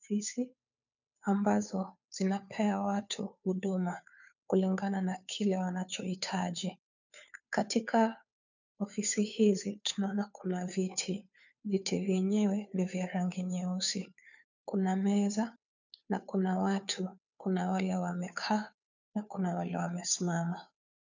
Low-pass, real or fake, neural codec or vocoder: 7.2 kHz; fake; codec, 16 kHz, 2 kbps, FunCodec, trained on Chinese and English, 25 frames a second